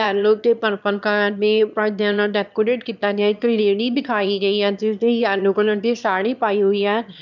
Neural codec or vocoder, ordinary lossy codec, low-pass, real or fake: autoencoder, 22.05 kHz, a latent of 192 numbers a frame, VITS, trained on one speaker; none; 7.2 kHz; fake